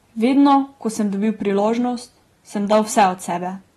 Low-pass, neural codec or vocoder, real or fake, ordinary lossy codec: 19.8 kHz; none; real; AAC, 32 kbps